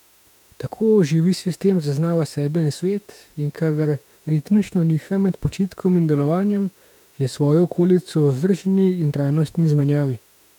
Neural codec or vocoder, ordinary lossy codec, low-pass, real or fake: autoencoder, 48 kHz, 32 numbers a frame, DAC-VAE, trained on Japanese speech; none; 19.8 kHz; fake